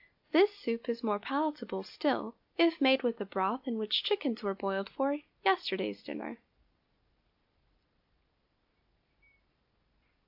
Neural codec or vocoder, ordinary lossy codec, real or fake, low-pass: none; AAC, 48 kbps; real; 5.4 kHz